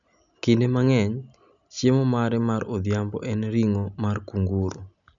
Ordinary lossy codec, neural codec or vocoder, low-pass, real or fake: none; none; 7.2 kHz; real